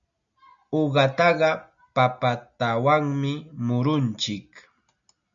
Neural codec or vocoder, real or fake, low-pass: none; real; 7.2 kHz